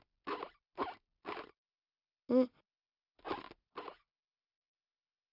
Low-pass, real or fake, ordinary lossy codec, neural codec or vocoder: 5.4 kHz; real; none; none